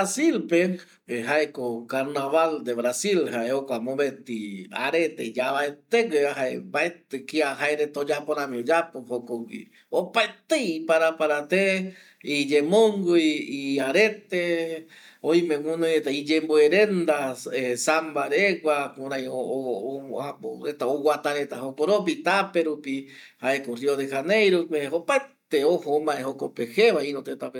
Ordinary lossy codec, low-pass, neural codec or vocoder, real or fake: none; 19.8 kHz; none; real